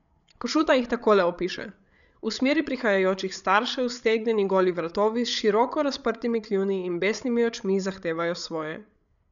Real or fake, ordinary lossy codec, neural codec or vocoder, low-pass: fake; none; codec, 16 kHz, 8 kbps, FreqCodec, larger model; 7.2 kHz